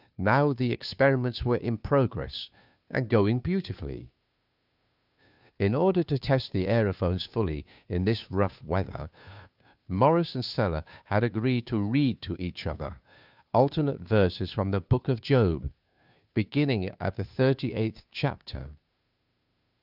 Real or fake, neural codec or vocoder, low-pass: fake; codec, 16 kHz, 2 kbps, FunCodec, trained on Chinese and English, 25 frames a second; 5.4 kHz